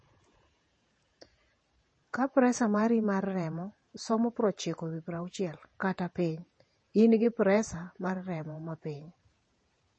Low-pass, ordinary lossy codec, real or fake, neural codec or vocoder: 9.9 kHz; MP3, 32 kbps; fake; vocoder, 48 kHz, 128 mel bands, Vocos